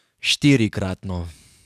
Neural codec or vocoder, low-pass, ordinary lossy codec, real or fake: codec, 44.1 kHz, 7.8 kbps, DAC; 14.4 kHz; none; fake